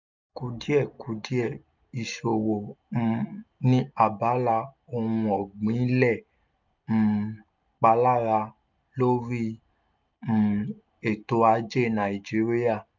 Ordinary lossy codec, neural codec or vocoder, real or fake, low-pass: none; none; real; 7.2 kHz